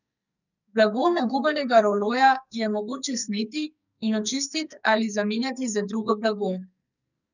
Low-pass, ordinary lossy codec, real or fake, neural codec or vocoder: 7.2 kHz; none; fake; codec, 44.1 kHz, 2.6 kbps, SNAC